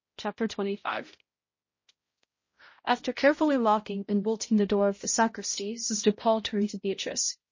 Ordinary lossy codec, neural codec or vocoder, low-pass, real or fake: MP3, 32 kbps; codec, 16 kHz, 0.5 kbps, X-Codec, HuBERT features, trained on balanced general audio; 7.2 kHz; fake